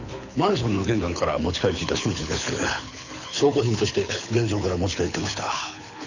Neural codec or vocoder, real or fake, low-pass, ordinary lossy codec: codec, 24 kHz, 6 kbps, HILCodec; fake; 7.2 kHz; none